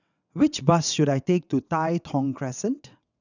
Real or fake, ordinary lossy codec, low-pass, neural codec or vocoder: fake; none; 7.2 kHz; vocoder, 22.05 kHz, 80 mel bands, WaveNeXt